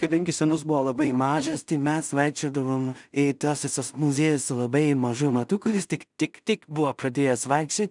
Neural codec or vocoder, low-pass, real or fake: codec, 16 kHz in and 24 kHz out, 0.4 kbps, LongCat-Audio-Codec, two codebook decoder; 10.8 kHz; fake